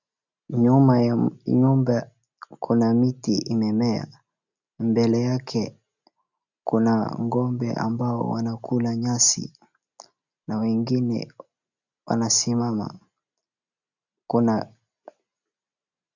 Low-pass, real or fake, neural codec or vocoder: 7.2 kHz; real; none